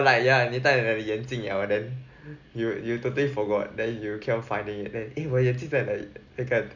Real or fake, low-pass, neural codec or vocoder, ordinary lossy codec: real; 7.2 kHz; none; none